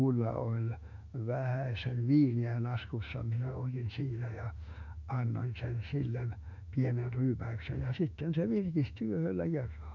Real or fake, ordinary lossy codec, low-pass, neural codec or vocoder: fake; none; 7.2 kHz; autoencoder, 48 kHz, 32 numbers a frame, DAC-VAE, trained on Japanese speech